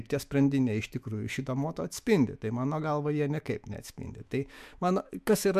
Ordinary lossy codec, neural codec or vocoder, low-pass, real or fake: MP3, 96 kbps; autoencoder, 48 kHz, 128 numbers a frame, DAC-VAE, trained on Japanese speech; 14.4 kHz; fake